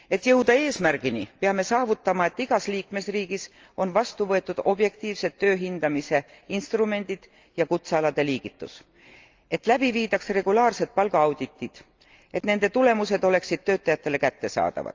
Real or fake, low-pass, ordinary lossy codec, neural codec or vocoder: real; 7.2 kHz; Opus, 24 kbps; none